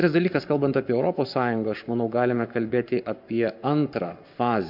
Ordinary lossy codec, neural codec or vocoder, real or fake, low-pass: MP3, 48 kbps; codec, 44.1 kHz, 7.8 kbps, Pupu-Codec; fake; 5.4 kHz